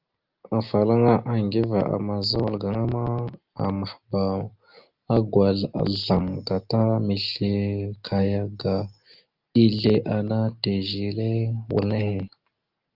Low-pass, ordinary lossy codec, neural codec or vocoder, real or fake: 5.4 kHz; Opus, 24 kbps; none; real